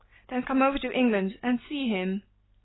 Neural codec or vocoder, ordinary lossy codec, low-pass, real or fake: none; AAC, 16 kbps; 7.2 kHz; real